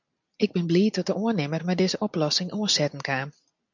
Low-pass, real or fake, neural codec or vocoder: 7.2 kHz; real; none